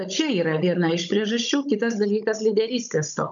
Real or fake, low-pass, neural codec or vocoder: fake; 7.2 kHz; codec, 16 kHz, 16 kbps, FunCodec, trained on Chinese and English, 50 frames a second